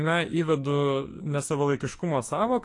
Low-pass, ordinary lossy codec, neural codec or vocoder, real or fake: 10.8 kHz; AAC, 48 kbps; codec, 44.1 kHz, 2.6 kbps, SNAC; fake